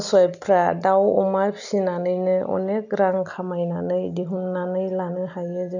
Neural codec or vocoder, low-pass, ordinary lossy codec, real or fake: none; 7.2 kHz; none; real